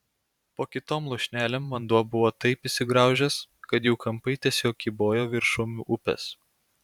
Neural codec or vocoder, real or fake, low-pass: vocoder, 44.1 kHz, 128 mel bands every 512 samples, BigVGAN v2; fake; 19.8 kHz